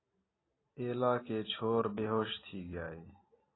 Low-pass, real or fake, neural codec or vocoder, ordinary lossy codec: 7.2 kHz; real; none; AAC, 16 kbps